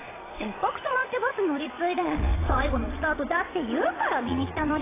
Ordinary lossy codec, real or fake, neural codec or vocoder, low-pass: AAC, 16 kbps; fake; vocoder, 22.05 kHz, 80 mel bands, Vocos; 3.6 kHz